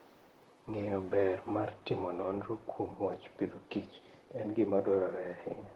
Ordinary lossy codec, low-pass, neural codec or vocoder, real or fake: Opus, 16 kbps; 19.8 kHz; vocoder, 44.1 kHz, 128 mel bands, Pupu-Vocoder; fake